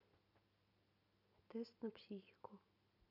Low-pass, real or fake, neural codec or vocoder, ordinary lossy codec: 5.4 kHz; real; none; none